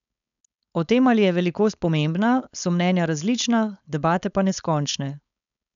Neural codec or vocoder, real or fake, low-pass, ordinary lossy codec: codec, 16 kHz, 4.8 kbps, FACodec; fake; 7.2 kHz; none